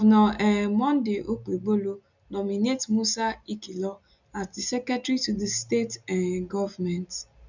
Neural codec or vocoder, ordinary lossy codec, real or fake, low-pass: none; none; real; 7.2 kHz